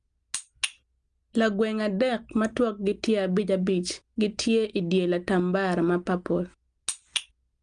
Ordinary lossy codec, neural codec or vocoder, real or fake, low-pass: Opus, 32 kbps; none; real; 9.9 kHz